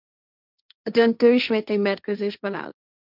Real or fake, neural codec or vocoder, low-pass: fake; codec, 16 kHz, 1.1 kbps, Voila-Tokenizer; 5.4 kHz